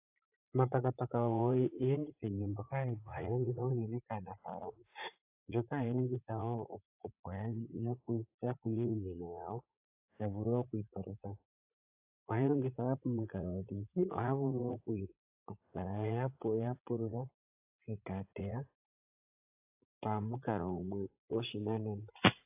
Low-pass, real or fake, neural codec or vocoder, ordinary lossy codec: 3.6 kHz; fake; vocoder, 44.1 kHz, 80 mel bands, Vocos; AAC, 32 kbps